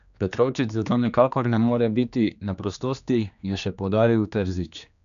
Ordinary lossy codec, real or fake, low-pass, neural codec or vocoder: none; fake; 7.2 kHz; codec, 16 kHz, 2 kbps, X-Codec, HuBERT features, trained on general audio